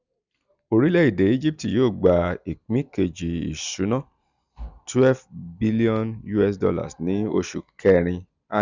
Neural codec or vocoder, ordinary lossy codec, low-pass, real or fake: none; none; 7.2 kHz; real